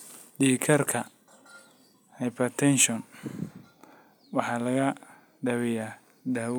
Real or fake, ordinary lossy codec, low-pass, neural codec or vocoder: real; none; none; none